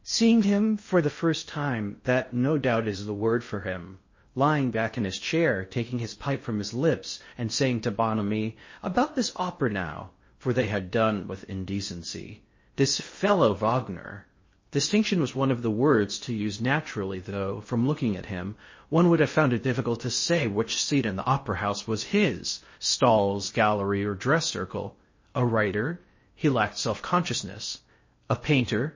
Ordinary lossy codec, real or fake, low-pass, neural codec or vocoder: MP3, 32 kbps; fake; 7.2 kHz; codec, 16 kHz in and 24 kHz out, 0.6 kbps, FocalCodec, streaming, 2048 codes